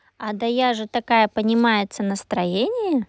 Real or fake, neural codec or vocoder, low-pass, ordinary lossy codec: real; none; none; none